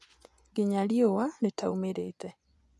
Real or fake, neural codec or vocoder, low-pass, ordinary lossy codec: fake; vocoder, 24 kHz, 100 mel bands, Vocos; none; none